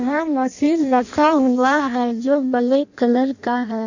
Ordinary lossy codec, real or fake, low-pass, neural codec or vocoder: none; fake; 7.2 kHz; codec, 16 kHz in and 24 kHz out, 0.6 kbps, FireRedTTS-2 codec